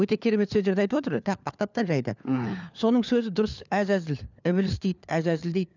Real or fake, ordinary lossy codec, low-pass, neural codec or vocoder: fake; none; 7.2 kHz; codec, 16 kHz, 4 kbps, FunCodec, trained on LibriTTS, 50 frames a second